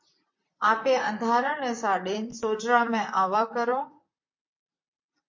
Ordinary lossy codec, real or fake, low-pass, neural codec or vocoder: MP3, 48 kbps; fake; 7.2 kHz; vocoder, 44.1 kHz, 128 mel bands, Pupu-Vocoder